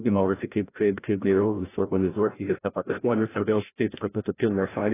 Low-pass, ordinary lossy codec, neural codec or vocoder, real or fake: 3.6 kHz; AAC, 16 kbps; codec, 16 kHz, 0.5 kbps, FreqCodec, larger model; fake